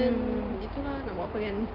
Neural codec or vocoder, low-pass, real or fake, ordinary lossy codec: none; 5.4 kHz; real; Opus, 24 kbps